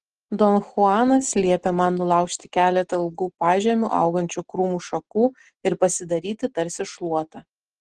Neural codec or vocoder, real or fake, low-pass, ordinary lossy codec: none; real; 10.8 kHz; Opus, 16 kbps